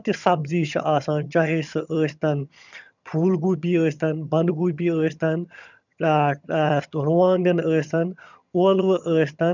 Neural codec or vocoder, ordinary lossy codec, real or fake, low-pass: vocoder, 22.05 kHz, 80 mel bands, HiFi-GAN; none; fake; 7.2 kHz